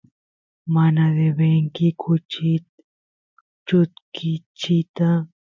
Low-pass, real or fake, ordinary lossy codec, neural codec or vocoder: 7.2 kHz; real; MP3, 64 kbps; none